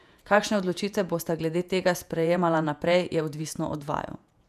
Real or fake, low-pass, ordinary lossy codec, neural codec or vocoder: fake; 14.4 kHz; none; vocoder, 48 kHz, 128 mel bands, Vocos